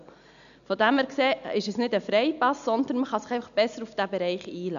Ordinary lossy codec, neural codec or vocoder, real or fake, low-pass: none; none; real; 7.2 kHz